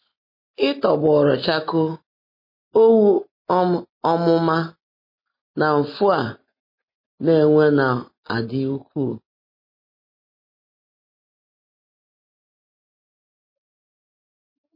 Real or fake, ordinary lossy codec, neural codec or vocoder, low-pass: real; MP3, 24 kbps; none; 5.4 kHz